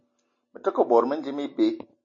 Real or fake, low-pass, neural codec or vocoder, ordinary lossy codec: real; 7.2 kHz; none; MP3, 32 kbps